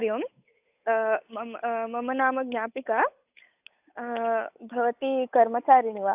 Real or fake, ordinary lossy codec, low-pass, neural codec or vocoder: fake; AAC, 32 kbps; 3.6 kHz; codec, 16 kHz, 8 kbps, FunCodec, trained on Chinese and English, 25 frames a second